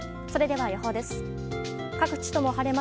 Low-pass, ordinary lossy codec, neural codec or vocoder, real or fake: none; none; none; real